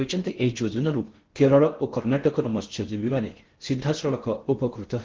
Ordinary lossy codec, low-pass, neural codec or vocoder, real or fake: Opus, 16 kbps; 7.2 kHz; codec, 16 kHz in and 24 kHz out, 0.6 kbps, FocalCodec, streaming, 4096 codes; fake